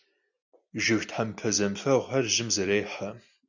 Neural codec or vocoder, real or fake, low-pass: none; real; 7.2 kHz